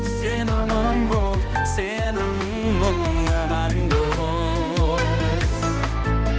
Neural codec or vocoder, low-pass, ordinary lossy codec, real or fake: codec, 16 kHz, 1 kbps, X-Codec, HuBERT features, trained on balanced general audio; none; none; fake